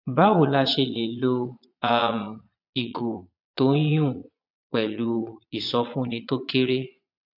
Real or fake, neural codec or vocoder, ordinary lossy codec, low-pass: fake; vocoder, 22.05 kHz, 80 mel bands, Vocos; none; 5.4 kHz